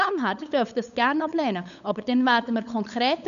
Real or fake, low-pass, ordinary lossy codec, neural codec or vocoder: fake; 7.2 kHz; none; codec, 16 kHz, 8 kbps, FunCodec, trained on LibriTTS, 25 frames a second